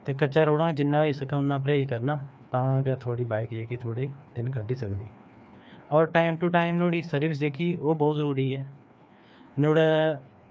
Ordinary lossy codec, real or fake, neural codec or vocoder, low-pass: none; fake; codec, 16 kHz, 2 kbps, FreqCodec, larger model; none